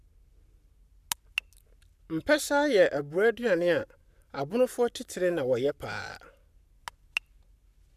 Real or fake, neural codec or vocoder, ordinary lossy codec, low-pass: fake; codec, 44.1 kHz, 7.8 kbps, Pupu-Codec; none; 14.4 kHz